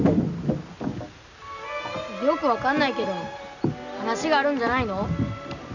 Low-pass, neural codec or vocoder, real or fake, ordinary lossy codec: 7.2 kHz; none; real; none